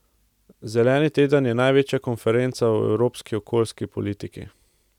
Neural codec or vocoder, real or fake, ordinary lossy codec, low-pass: none; real; none; 19.8 kHz